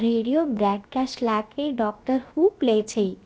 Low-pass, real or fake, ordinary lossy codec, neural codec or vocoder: none; fake; none; codec, 16 kHz, 0.7 kbps, FocalCodec